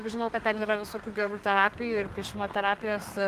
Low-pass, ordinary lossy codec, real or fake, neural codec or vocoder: 14.4 kHz; Opus, 24 kbps; fake; codec, 32 kHz, 1.9 kbps, SNAC